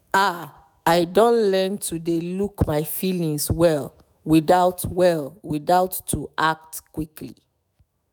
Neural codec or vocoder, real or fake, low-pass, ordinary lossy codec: autoencoder, 48 kHz, 128 numbers a frame, DAC-VAE, trained on Japanese speech; fake; none; none